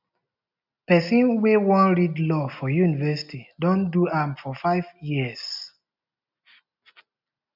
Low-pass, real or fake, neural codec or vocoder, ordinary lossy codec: 5.4 kHz; real; none; none